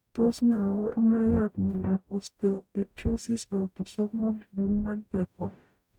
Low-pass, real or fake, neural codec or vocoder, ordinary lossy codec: 19.8 kHz; fake; codec, 44.1 kHz, 0.9 kbps, DAC; none